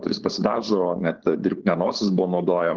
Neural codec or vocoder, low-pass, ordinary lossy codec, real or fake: codec, 16 kHz, 4.8 kbps, FACodec; 7.2 kHz; Opus, 16 kbps; fake